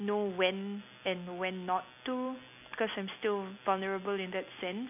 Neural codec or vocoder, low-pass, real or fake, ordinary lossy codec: none; 3.6 kHz; real; none